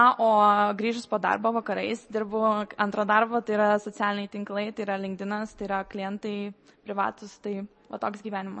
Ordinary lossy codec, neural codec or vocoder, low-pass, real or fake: MP3, 32 kbps; none; 10.8 kHz; real